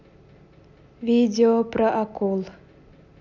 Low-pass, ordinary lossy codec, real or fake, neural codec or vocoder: 7.2 kHz; none; real; none